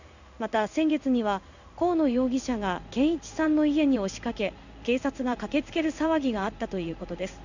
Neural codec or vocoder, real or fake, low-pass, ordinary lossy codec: codec, 16 kHz in and 24 kHz out, 1 kbps, XY-Tokenizer; fake; 7.2 kHz; none